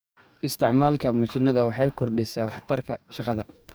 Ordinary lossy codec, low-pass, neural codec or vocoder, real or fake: none; none; codec, 44.1 kHz, 2.6 kbps, DAC; fake